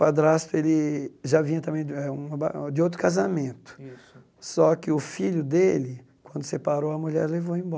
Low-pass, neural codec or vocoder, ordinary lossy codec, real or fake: none; none; none; real